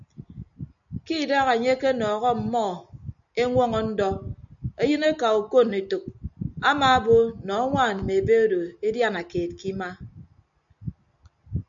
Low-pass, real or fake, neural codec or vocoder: 7.2 kHz; real; none